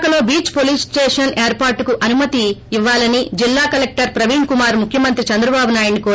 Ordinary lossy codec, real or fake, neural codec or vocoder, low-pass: none; real; none; none